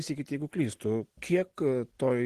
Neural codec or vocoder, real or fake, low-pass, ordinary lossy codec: none; real; 14.4 kHz; Opus, 16 kbps